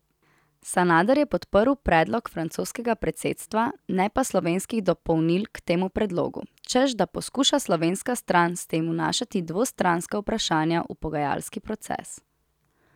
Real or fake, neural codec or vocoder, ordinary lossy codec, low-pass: real; none; none; 19.8 kHz